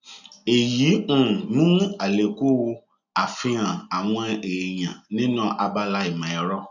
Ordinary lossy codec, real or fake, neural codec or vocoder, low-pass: none; real; none; 7.2 kHz